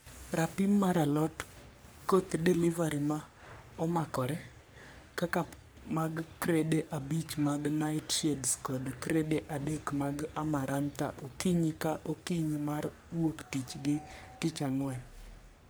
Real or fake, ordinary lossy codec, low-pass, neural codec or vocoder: fake; none; none; codec, 44.1 kHz, 3.4 kbps, Pupu-Codec